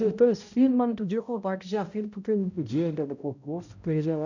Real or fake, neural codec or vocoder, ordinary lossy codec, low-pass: fake; codec, 16 kHz, 0.5 kbps, X-Codec, HuBERT features, trained on balanced general audio; none; 7.2 kHz